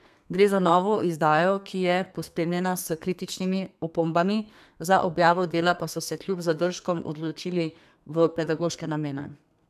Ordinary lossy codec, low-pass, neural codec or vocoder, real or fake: none; 14.4 kHz; codec, 32 kHz, 1.9 kbps, SNAC; fake